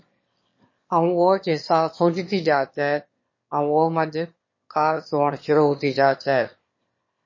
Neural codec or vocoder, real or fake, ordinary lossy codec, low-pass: autoencoder, 22.05 kHz, a latent of 192 numbers a frame, VITS, trained on one speaker; fake; MP3, 32 kbps; 7.2 kHz